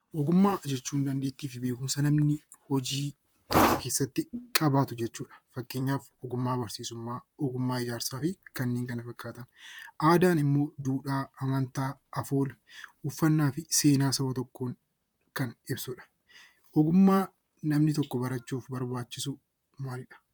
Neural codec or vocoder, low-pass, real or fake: vocoder, 44.1 kHz, 128 mel bands, Pupu-Vocoder; 19.8 kHz; fake